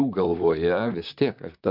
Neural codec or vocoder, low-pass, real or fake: vocoder, 44.1 kHz, 128 mel bands, Pupu-Vocoder; 5.4 kHz; fake